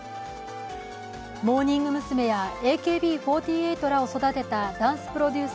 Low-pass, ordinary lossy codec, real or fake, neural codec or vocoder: none; none; real; none